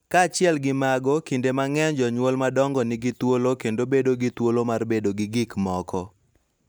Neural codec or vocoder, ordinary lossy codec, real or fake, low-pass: none; none; real; none